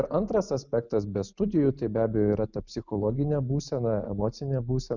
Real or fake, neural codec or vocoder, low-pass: real; none; 7.2 kHz